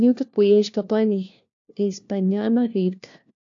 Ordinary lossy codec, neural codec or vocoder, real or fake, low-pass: AAC, 64 kbps; codec, 16 kHz, 1 kbps, FunCodec, trained on LibriTTS, 50 frames a second; fake; 7.2 kHz